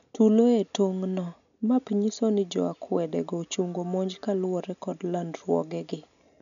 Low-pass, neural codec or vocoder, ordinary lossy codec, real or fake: 7.2 kHz; none; none; real